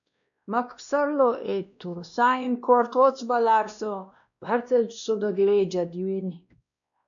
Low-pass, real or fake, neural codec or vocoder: 7.2 kHz; fake; codec, 16 kHz, 1 kbps, X-Codec, WavLM features, trained on Multilingual LibriSpeech